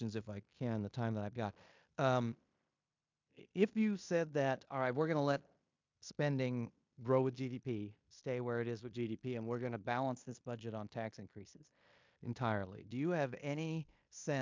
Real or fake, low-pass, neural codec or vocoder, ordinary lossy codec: fake; 7.2 kHz; codec, 16 kHz in and 24 kHz out, 0.9 kbps, LongCat-Audio-Codec, fine tuned four codebook decoder; MP3, 64 kbps